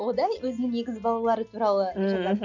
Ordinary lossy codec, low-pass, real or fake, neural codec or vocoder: none; 7.2 kHz; real; none